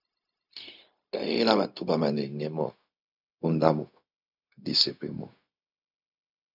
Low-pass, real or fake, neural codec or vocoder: 5.4 kHz; fake; codec, 16 kHz, 0.4 kbps, LongCat-Audio-Codec